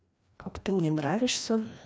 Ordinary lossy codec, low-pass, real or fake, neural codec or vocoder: none; none; fake; codec, 16 kHz, 1 kbps, FreqCodec, larger model